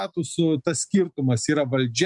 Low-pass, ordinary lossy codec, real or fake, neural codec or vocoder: 10.8 kHz; MP3, 96 kbps; real; none